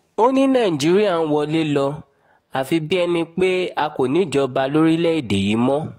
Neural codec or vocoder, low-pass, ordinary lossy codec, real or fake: codec, 44.1 kHz, 7.8 kbps, DAC; 19.8 kHz; AAC, 48 kbps; fake